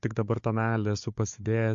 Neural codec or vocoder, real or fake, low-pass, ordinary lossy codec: codec, 16 kHz, 4 kbps, FunCodec, trained on Chinese and English, 50 frames a second; fake; 7.2 kHz; MP3, 48 kbps